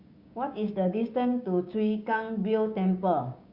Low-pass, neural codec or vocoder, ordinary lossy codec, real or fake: 5.4 kHz; codec, 16 kHz, 6 kbps, DAC; Opus, 64 kbps; fake